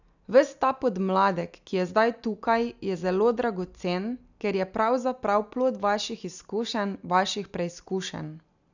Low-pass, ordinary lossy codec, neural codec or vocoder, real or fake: 7.2 kHz; none; none; real